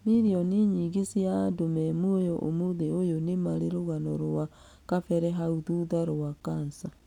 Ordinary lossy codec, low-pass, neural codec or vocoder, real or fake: none; 19.8 kHz; none; real